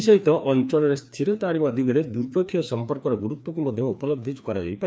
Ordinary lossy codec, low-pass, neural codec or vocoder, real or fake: none; none; codec, 16 kHz, 2 kbps, FreqCodec, larger model; fake